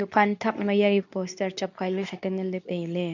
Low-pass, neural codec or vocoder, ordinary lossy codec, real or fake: 7.2 kHz; codec, 24 kHz, 0.9 kbps, WavTokenizer, medium speech release version 2; none; fake